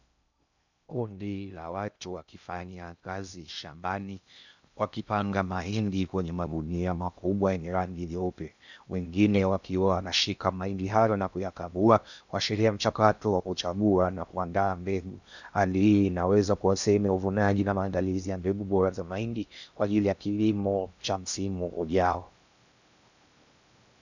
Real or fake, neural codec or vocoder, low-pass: fake; codec, 16 kHz in and 24 kHz out, 0.6 kbps, FocalCodec, streaming, 2048 codes; 7.2 kHz